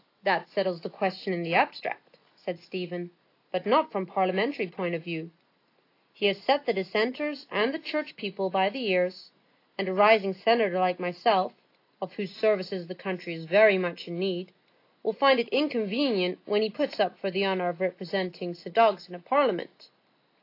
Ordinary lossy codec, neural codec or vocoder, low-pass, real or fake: AAC, 32 kbps; none; 5.4 kHz; real